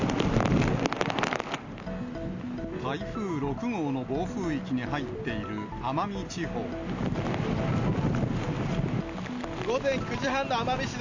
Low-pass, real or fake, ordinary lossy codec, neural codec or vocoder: 7.2 kHz; real; MP3, 64 kbps; none